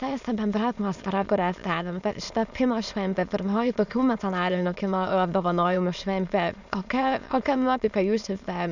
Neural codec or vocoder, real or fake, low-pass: autoencoder, 22.05 kHz, a latent of 192 numbers a frame, VITS, trained on many speakers; fake; 7.2 kHz